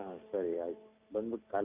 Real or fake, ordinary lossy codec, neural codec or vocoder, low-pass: fake; Opus, 64 kbps; vocoder, 44.1 kHz, 128 mel bands every 256 samples, BigVGAN v2; 3.6 kHz